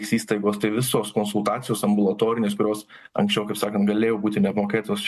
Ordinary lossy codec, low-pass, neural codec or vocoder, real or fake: MP3, 64 kbps; 14.4 kHz; none; real